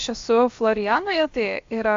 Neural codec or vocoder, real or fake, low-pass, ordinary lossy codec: codec, 16 kHz, about 1 kbps, DyCAST, with the encoder's durations; fake; 7.2 kHz; AAC, 48 kbps